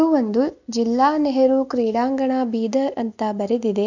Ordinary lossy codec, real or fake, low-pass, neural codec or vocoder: none; real; 7.2 kHz; none